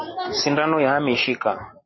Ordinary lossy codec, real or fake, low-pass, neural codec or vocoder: MP3, 24 kbps; real; 7.2 kHz; none